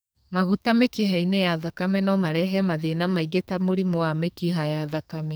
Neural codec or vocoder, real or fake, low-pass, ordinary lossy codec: codec, 44.1 kHz, 2.6 kbps, SNAC; fake; none; none